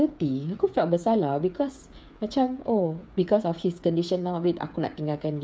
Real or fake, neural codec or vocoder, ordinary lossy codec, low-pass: fake; codec, 16 kHz, 8 kbps, FreqCodec, smaller model; none; none